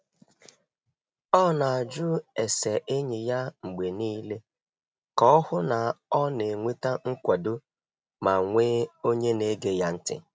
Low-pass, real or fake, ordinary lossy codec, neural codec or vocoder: none; real; none; none